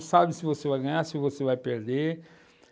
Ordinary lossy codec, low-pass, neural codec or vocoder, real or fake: none; none; none; real